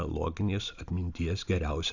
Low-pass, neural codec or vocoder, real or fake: 7.2 kHz; none; real